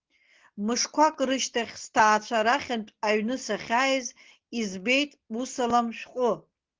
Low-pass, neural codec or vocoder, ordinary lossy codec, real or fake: 7.2 kHz; none; Opus, 16 kbps; real